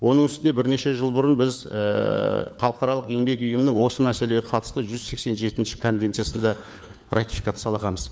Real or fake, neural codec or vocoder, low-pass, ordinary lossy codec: fake; codec, 16 kHz, 4 kbps, FunCodec, trained on LibriTTS, 50 frames a second; none; none